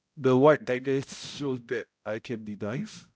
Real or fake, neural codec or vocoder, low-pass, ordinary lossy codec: fake; codec, 16 kHz, 0.5 kbps, X-Codec, HuBERT features, trained on balanced general audio; none; none